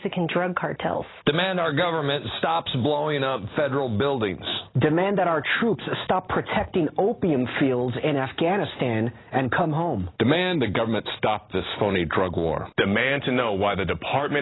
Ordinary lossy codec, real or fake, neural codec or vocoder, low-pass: AAC, 16 kbps; real; none; 7.2 kHz